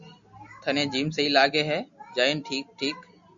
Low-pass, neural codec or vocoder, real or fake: 7.2 kHz; none; real